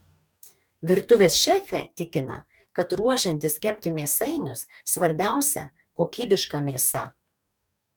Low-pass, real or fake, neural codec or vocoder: 19.8 kHz; fake; codec, 44.1 kHz, 2.6 kbps, DAC